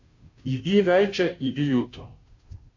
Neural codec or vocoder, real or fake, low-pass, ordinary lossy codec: codec, 16 kHz, 0.5 kbps, FunCodec, trained on Chinese and English, 25 frames a second; fake; 7.2 kHz; MP3, 48 kbps